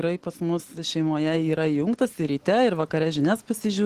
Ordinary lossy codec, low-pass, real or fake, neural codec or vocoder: Opus, 16 kbps; 14.4 kHz; real; none